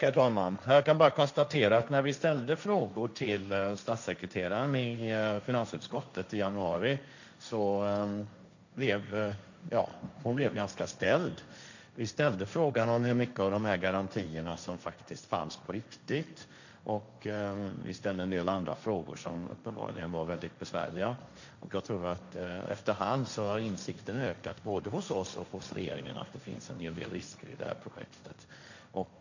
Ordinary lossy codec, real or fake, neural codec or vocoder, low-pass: none; fake; codec, 16 kHz, 1.1 kbps, Voila-Tokenizer; 7.2 kHz